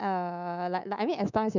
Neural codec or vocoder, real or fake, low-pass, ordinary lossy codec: codec, 24 kHz, 3.1 kbps, DualCodec; fake; 7.2 kHz; none